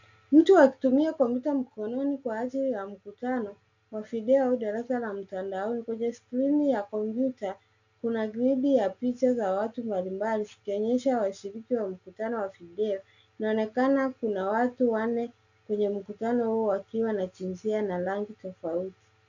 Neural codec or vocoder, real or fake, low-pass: none; real; 7.2 kHz